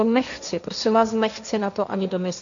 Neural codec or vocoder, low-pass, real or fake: codec, 16 kHz, 1.1 kbps, Voila-Tokenizer; 7.2 kHz; fake